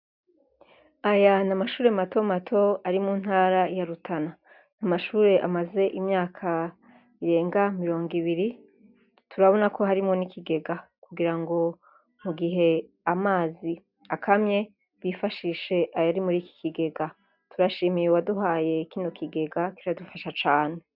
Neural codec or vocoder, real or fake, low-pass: none; real; 5.4 kHz